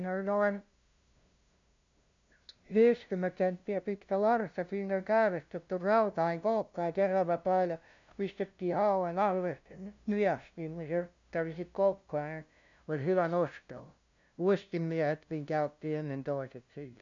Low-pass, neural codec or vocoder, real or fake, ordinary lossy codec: 7.2 kHz; codec, 16 kHz, 0.5 kbps, FunCodec, trained on LibriTTS, 25 frames a second; fake; MP3, 64 kbps